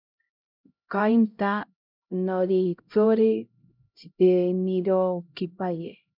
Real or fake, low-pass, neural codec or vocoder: fake; 5.4 kHz; codec, 16 kHz, 0.5 kbps, X-Codec, HuBERT features, trained on LibriSpeech